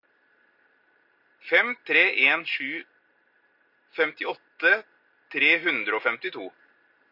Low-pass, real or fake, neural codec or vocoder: 5.4 kHz; real; none